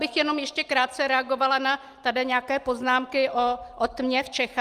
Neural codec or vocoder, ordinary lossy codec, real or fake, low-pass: none; Opus, 32 kbps; real; 14.4 kHz